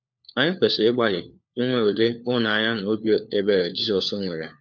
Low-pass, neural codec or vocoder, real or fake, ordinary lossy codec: 7.2 kHz; codec, 16 kHz, 4 kbps, FunCodec, trained on LibriTTS, 50 frames a second; fake; none